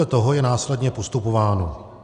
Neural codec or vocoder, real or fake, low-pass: none; real; 10.8 kHz